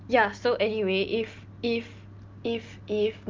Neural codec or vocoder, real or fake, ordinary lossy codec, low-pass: none; real; Opus, 32 kbps; 7.2 kHz